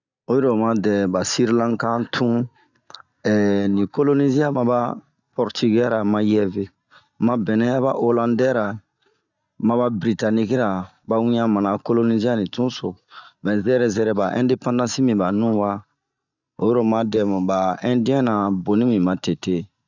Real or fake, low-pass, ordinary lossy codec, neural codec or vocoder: real; 7.2 kHz; none; none